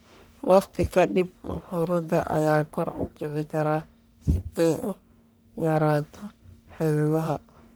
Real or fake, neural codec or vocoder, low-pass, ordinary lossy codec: fake; codec, 44.1 kHz, 1.7 kbps, Pupu-Codec; none; none